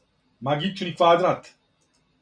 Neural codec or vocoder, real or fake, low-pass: none; real; 9.9 kHz